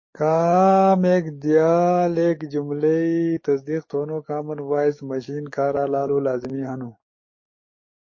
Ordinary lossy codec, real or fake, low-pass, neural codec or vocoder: MP3, 32 kbps; fake; 7.2 kHz; codec, 44.1 kHz, 7.8 kbps, DAC